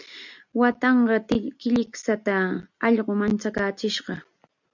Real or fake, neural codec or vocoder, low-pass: real; none; 7.2 kHz